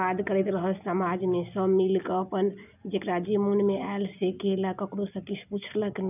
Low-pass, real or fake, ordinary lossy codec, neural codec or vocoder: 3.6 kHz; real; none; none